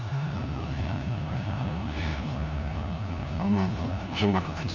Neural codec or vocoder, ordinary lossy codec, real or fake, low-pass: codec, 16 kHz, 1 kbps, FunCodec, trained on LibriTTS, 50 frames a second; none; fake; 7.2 kHz